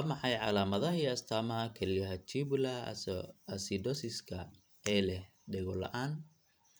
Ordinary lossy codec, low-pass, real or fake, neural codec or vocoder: none; none; fake; vocoder, 44.1 kHz, 128 mel bands every 512 samples, BigVGAN v2